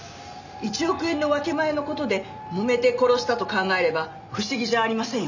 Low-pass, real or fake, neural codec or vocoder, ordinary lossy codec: 7.2 kHz; real; none; none